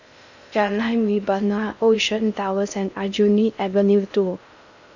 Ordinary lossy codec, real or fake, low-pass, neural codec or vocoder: none; fake; 7.2 kHz; codec, 16 kHz in and 24 kHz out, 0.6 kbps, FocalCodec, streaming, 4096 codes